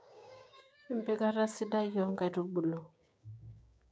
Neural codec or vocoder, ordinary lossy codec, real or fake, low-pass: codec, 16 kHz, 6 kbps, DAC; none; fake; none